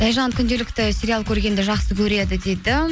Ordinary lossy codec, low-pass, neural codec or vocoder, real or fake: none; none; none; real